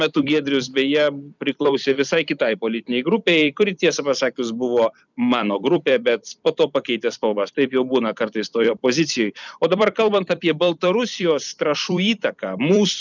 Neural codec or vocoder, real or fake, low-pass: vocoder, 44.1 kHz, 128 mel bands every 256 samples, BigVGAN v2; fake; 7.2 kHz